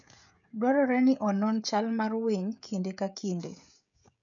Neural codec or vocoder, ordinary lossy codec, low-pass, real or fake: codec, 16 kHz, 16 kbps, FreqCodec, smaller model; none; 7.2 kHz; fake